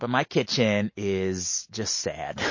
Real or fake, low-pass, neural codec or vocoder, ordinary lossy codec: real; 7.2 kHz; none; MP3, 32 kbps